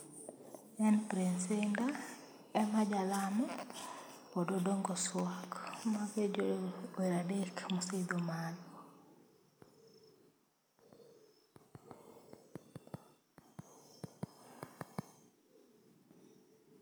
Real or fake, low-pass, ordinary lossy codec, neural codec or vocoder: real; none; none; none